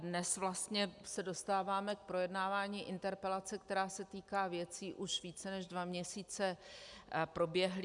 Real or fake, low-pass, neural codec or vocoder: real; 10.8 kHz; none